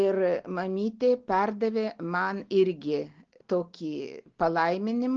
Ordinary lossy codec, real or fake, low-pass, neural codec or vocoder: Opus, 16 kbps; real; 7.2 kHz; none